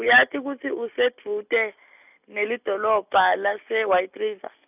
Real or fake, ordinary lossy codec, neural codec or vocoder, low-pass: real; none; none; 3.6 kHz